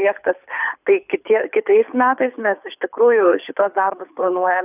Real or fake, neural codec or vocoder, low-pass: fake; codec, 24 kHz, 6 kbps, HILCodec; 3.6 kHz